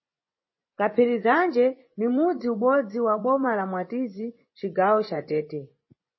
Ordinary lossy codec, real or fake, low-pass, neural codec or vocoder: MP3, 24 kbps; fake; 7.2 kHz; vocoder, 24 kHz, 100 mel bands, Vocos